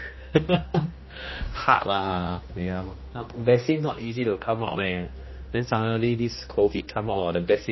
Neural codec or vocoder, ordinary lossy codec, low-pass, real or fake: codec, 16 kHz, 1 kbps, X-Codec, HuBERT features, trained on general audio; MP3, 24 kbps; 7.2 kHz; fake